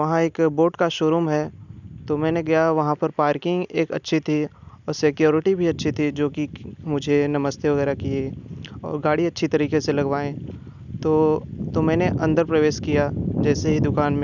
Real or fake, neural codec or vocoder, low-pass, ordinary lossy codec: real; none; 7.2 kHz; none